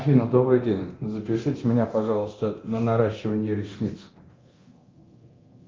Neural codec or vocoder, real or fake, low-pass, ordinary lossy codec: codec, 24 kHz, 0.9 kbps, DualCodec; fake; 7.2 kHz; Opus, 24 kbps